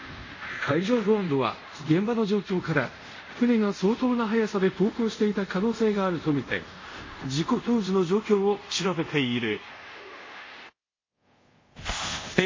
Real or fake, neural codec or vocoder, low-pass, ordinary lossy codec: fake; codec, 24 kHz, 0.5 kbps, DualCodec; 7.2 kHz; MP3, 32 kbps